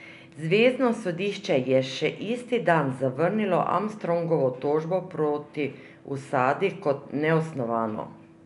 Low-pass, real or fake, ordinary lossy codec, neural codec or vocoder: 10.8 kHz; real; none; none